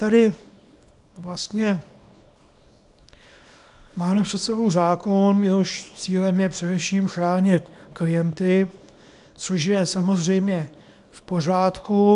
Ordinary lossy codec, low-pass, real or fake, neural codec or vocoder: AAC, 96 kbps; 10.8 kHz; fake; codec, 24 kHz, 0.9 kbps, WavTokenizer, small release